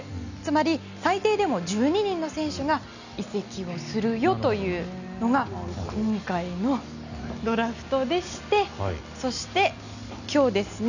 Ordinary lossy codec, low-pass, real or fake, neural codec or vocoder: none; 7.2 kHz; real; none